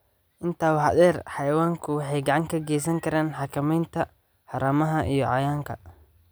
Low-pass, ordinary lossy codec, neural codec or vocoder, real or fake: none; none; none; real